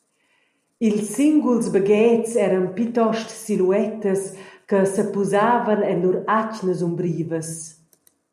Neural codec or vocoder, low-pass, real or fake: none; 14.4 kHz; real